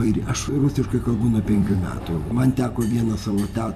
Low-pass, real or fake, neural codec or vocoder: 14.4 kHz; fake; vocoder, 44.1 kHz, 128 mel bands every 256 samples, BigVGAN v2